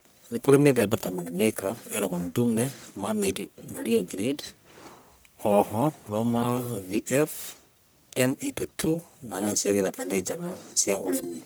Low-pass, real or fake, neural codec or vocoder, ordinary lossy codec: none; fake; codec, 44.1 kHz, 1.7 kbps, Pupu-Codec; none